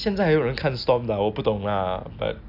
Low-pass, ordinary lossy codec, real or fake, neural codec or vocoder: 5.4 kHz; AAC, 48 kbps; real; none